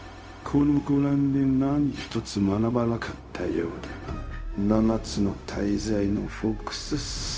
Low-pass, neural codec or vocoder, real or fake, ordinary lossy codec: none; codec, 16 kHz, 0.4 kbps, LongCat-Audio-Codec; fake; none